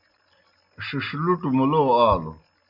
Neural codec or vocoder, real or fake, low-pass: none; real; 5.4 kHz